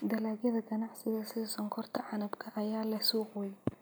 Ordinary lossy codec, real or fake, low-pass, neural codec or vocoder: none; real; none; none